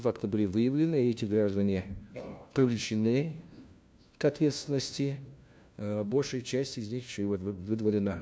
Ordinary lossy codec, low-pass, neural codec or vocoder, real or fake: none; none; codec, 16 kHz, 1 kbps, FunCodec, trained on LibriTTS, 50 frames a second; fake